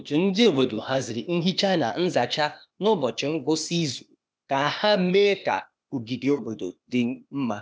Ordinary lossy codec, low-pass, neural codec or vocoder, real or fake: none; none; codec, 16 kHz, 0.8 kbps, ZipCodec; fake